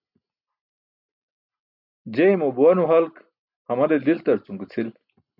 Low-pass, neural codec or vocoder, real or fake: 5.4 kHz; none; real